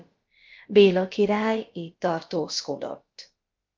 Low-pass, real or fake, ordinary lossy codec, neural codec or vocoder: 7.2 kHz; fake; Opus, 24 kbps; codec, 16 kHz, about 1 kbps, DyCAST, with the encoder's durations